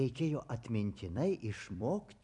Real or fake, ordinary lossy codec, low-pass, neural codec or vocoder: fake; MP3, 96 kbps; 10.8 kHz; vocoder, 44.1 kHz, 128 mel bands every 256 samples, BigVGAN v2